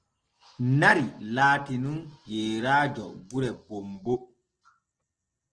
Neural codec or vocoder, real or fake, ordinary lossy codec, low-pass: none; real; Opus, 16 kbps; 9.9 kHz